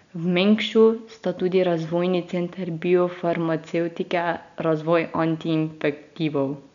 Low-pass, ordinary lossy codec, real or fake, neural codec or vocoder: 7.2 kHz; none; real; none